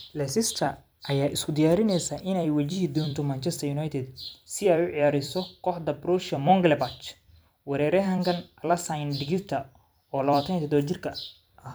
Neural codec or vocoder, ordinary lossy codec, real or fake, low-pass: vocoder, 44.1 kHz, 128 mel bands every 256 samples, BigVGAN v2; none; fake; none